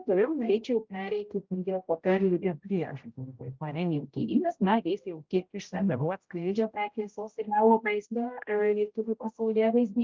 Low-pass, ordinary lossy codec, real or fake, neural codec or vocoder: 7.2 kHz; Opus, 24 kbps; fake; codec, 16 kHz, 0.5 kbps, X-Codec, HuBERT features, trained on general audio